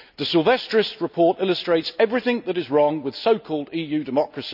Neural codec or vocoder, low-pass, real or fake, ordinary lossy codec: none; 5.4 kHz; real; none